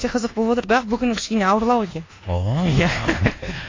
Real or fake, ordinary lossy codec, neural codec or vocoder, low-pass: fake; AAC, 32 kbps; codec, 24 kHz, 1.2 kbps, DualCodec; 7.2 kHz